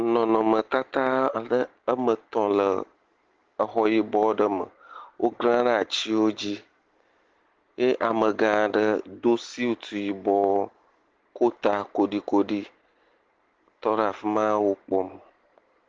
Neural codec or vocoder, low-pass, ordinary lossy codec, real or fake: none; 7.2 kHz; Opus, 16 kbps; real